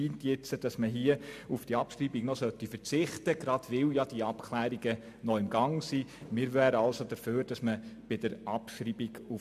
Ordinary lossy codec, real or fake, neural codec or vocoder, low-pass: MP3, 96 kbps; real; none; 14.4 kHz